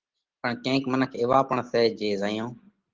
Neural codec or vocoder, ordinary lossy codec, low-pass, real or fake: none; Opus, 16 kbps; 7.2 kHz; real